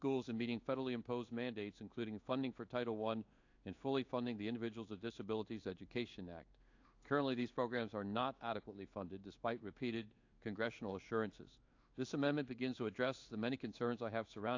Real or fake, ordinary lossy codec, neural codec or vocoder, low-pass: fake; AAC, 48 kbps; codec, 16 kHz in and 24 kHz out, 1 kbps, XY-Tokenizer; 7.2 kHz